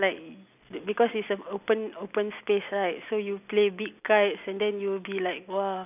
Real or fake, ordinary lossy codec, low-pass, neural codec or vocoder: real; none; 3.6 kHz; none